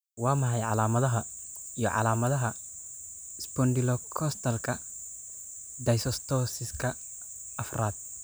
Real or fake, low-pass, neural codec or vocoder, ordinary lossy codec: real; none; none; none